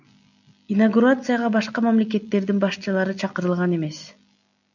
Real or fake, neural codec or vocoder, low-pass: real; none; 7.2 kHz